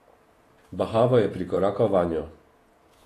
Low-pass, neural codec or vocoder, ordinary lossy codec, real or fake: 14.4 kHz; vocoder, 48 kHz, 128 mel bands, Vocos; AAC, 48 kbps; fake